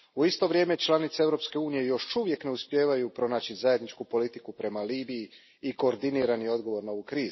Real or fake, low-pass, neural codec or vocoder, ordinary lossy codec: real; 7.2 kHz; none; MP3, 24 kbps